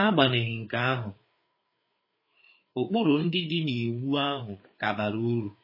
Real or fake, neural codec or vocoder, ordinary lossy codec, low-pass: fake; codec, 16 kHz, 4 kbps, FreqCodec, larger model; MP3, 24 kbps; 5.4 kHz